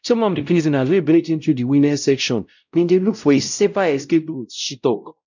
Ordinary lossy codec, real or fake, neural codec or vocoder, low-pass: none; fake; codec, 16 kHz, 0.5 kbps, X-Codec, WavLM features, trained on Multilingual LibriSpeech; 7.2 kHz